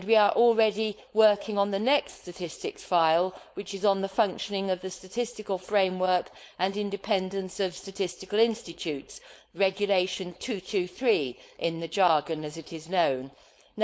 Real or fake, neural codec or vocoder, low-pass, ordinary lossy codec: fake; codec, 16 kHz, 4.8 kbps, FACodec; none; none